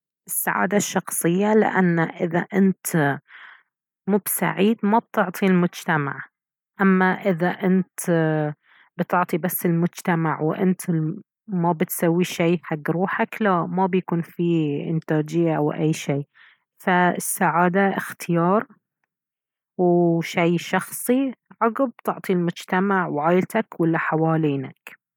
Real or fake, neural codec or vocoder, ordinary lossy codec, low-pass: real; none; none; 19.8 kHz